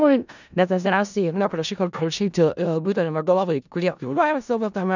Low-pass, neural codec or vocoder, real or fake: 7.2 kHz; codec, 16 kHz in and 24 kHz out, 0.4 kbps, LongCat-Audio-Codec, four codebook decoder; fake